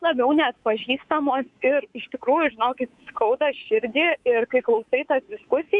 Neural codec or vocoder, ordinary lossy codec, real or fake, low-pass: codec, 24 kHz, 3.1 kbps, DualCodec; MP3, 96 kbps; fake; 10.8 kHz